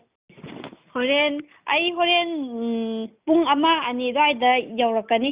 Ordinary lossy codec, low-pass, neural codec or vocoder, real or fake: Opus, 64 kbps; 3.6 kHz; none; real